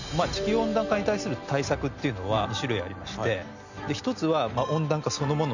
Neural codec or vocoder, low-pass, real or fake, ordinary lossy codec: none; 7.2 kHz; real; none